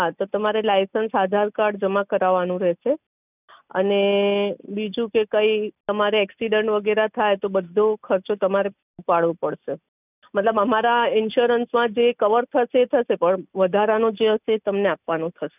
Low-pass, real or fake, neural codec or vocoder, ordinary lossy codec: 3.6 kHz; real; none; none